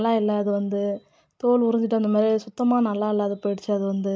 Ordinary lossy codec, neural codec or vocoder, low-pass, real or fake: none; none; none; real